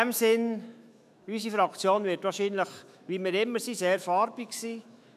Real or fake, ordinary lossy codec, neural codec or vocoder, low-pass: fake; none; autoencoder, 48 kHz, 128 numbers a frame, DAC-VAE, trained on Japanese speech; 14.4 kHz